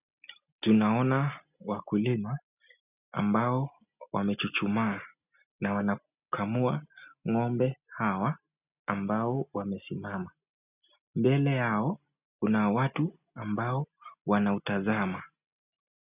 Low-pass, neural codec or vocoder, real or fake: 3.6 kHz; none; real